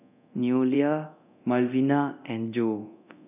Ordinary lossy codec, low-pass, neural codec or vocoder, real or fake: none; 3.6 kHz; codec, 24 kHz, 0.9 kbps, DualCodec; fake